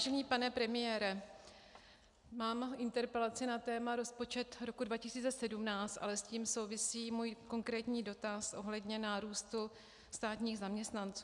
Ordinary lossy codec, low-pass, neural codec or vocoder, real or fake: MP3, 96 kbps; 10.8 kHz; none; real